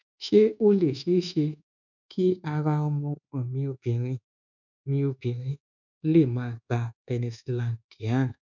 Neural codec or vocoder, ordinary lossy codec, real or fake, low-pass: codec, 24 kHz, 1.2 kbps, DualCodec; none; fake; 7.2 kHz